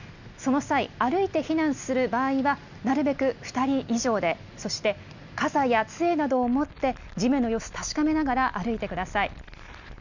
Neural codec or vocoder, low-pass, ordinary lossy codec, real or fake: none; 7.2 kHz; none; real